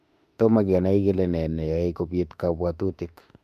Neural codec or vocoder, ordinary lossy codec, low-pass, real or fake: autoencoder, 48 kHz, 32 numbers a frame, DAC-VAE, trained on Japanese speech; MP3, 96 kbps; 14.4 kHz; fake